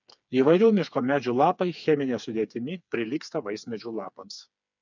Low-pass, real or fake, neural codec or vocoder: 7.2 kHz; fake; codec, 16 kHz, 4 kbps, FreqCodec, smaller model